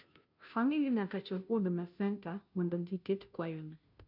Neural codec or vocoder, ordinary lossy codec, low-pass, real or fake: codec, 16 kHz, 0.5 kbps, FunCodec, trained on Chinese and English, 25 frames a second; none; 5.4 kHz; fake